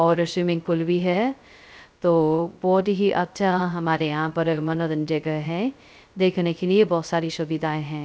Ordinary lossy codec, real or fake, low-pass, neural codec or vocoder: none; fake; none; codec, 16 kHz, 0.2 kbps, FocalCodec